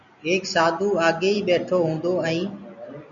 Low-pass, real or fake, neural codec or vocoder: 7.2 kHz; real; none